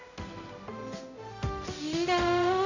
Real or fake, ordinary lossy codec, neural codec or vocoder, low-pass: fake; none; codec, 16 kHz, 0.5 kbps, X-Codec, HuBERT features, trained on general audio; 7.2 kHz